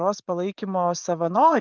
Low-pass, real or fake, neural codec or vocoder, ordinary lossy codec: 7.2 kHz; fake; vocoder, 24 kHz, 100 mel bands, Vocos; Opus, 32 kbps